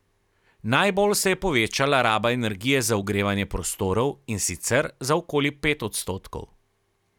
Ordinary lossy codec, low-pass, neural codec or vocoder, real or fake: none; 19.8 kHz; none; real